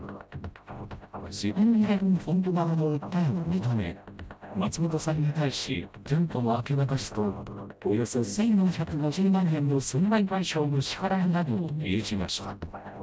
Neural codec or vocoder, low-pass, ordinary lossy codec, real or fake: codec, 16 kHz, 0.5 kbps, FreqCodec, smaller model; none; none; fake